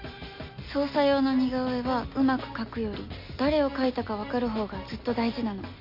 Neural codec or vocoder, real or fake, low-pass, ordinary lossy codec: none; real; 5.4 kHz; MP3, 32 kbps